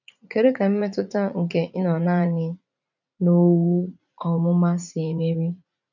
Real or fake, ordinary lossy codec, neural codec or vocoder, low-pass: fake; none; vocoder, 44.1 kHz, 80 mel bands, Vocos; 7.2 kHz